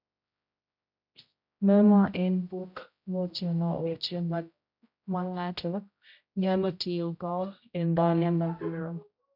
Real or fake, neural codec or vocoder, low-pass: fake; codec, 16 kHz, 0.5 kbps, X-Codec, HuBERT features, trained on general audio; 5.4 kHz